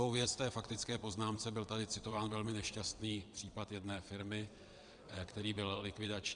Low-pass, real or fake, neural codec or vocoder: 9.9 kHz; fake; vocoder, 22.05 kHz, 80 mel bands, WaveNeXt